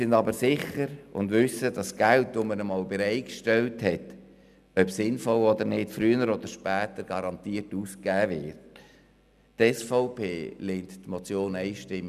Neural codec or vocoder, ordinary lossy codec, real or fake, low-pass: none; none; real; 14.4 kHz